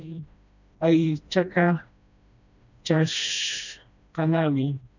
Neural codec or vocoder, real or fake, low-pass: codec, 16 kHz, 1 kbps, FreqCodec, smaller model; fake; 7.2 kHz